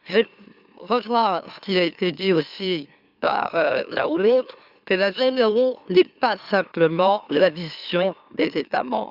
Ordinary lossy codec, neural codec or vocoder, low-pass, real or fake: Opus, 64 kbps; autoencoder, 44.1 kHz, a latent of 192 numbers a frame, MeloTTS; 5.4 kHz; fake